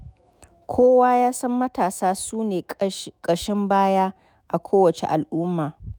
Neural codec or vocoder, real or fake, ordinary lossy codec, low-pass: autoencoder, 48 kHz, 128 numbers a frame, DAC-VAE, trained on Japanese speech; fake; none; none